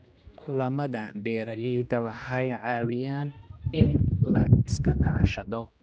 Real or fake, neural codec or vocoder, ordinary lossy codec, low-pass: fake; codec, 16 kHz, 1 kbps, X-Codec, HuBERT features, trained on general audio; none; none